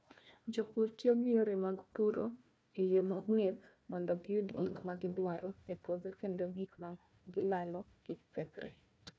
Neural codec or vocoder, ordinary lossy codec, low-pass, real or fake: codec, 16 kHz, 1 kbps, FunCodec, trained on Chinese and English, 50 frames a second; none; none; fake